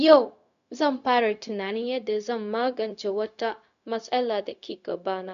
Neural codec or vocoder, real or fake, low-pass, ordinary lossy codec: codec, 16 kHz, 0.4 kbps, LongCat-Audio-Codec; fake; 7.2 kHz; none